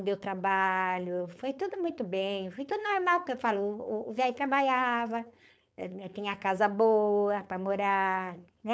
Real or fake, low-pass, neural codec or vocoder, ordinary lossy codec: fake; none; codec, 16 kHz, 4.8 kbps, FACodec; none